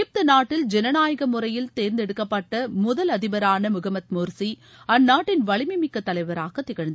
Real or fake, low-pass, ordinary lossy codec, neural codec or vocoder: real; none; none; none